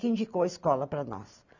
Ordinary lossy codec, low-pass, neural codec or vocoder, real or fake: none; 7.2 kHz; none; real